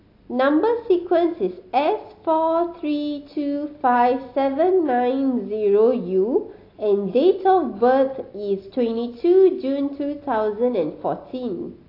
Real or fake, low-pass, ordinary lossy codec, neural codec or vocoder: real; 5.4 kHz; AAC, 32 kbps; none